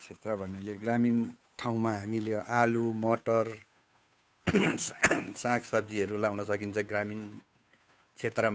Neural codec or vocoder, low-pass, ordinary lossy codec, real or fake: codec, 16 kHz, 2 kbps, FunCodec, trained on Chinese and English, 25 frames a second; none; none; fake